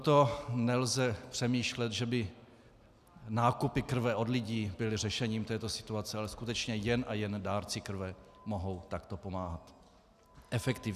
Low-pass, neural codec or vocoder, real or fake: 14.4 kHz; none; real